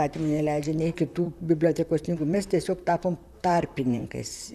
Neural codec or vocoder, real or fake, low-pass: vocoder, 44.1 kHz, 128 mel bands, Pupu-Vocoder; fake; 14.4 kHz